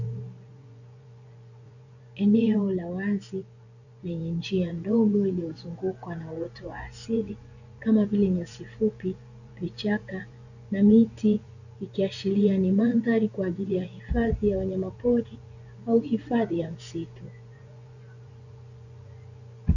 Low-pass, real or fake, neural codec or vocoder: 7.2 kHz; fake; vocoder, 24 kHz, 100 mel bands, Vocos